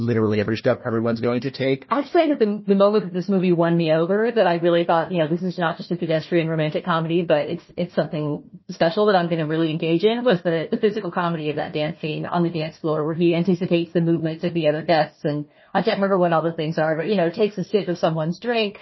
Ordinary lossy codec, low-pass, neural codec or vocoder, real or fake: MP3, 24 kbps; 7.2 kHz; codec, 16 kHz, 1 kbps, FunCodec, trained on Chinese and English, 50 frames a second; fake